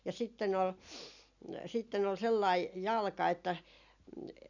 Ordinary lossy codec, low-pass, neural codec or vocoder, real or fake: none; 7.2 kHz; none; real